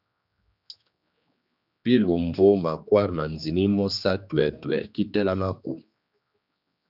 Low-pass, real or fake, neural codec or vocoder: 5.4 kHz; fake; codec, 16 kHz, 2 kbps, X-Codec, HuBERT features, trained on general audio